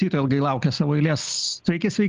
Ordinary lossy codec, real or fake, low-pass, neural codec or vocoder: Opus, 32 kbps; real; 7.2 kHz; none